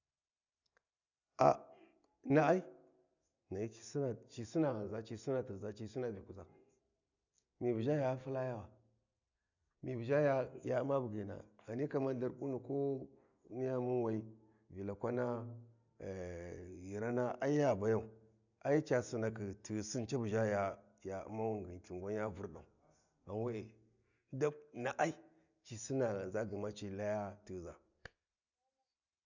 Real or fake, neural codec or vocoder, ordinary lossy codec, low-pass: real; none; none; 7.2 kHz